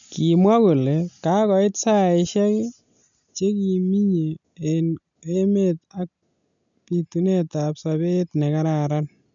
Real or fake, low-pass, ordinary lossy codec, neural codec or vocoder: real; 7.2 kHz; none; none